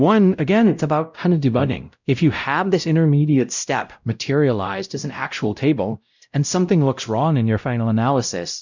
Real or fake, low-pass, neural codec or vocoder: fake; 7.2 kHz; codec, 16 kHz, 0.5 kbps, X-Codec, WavLM features, trained on Multilingual LibriSpeech